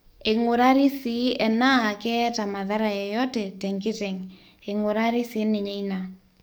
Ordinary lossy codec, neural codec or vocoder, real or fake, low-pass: none; codec, 44.1 kHz, 7.8 kbps, DAC; fake; none